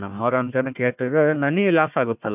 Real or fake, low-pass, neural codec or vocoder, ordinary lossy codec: fake; 3.6 kHz; codec, 16 kHz, 1 kbps, FunCodec, trained on Chinese and English, 50 frames a second; none